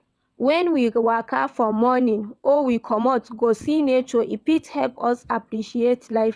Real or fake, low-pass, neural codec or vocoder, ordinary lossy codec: fake; none; vocoder, 22.05 kHz, 80 mel bands, WaveNeXt; none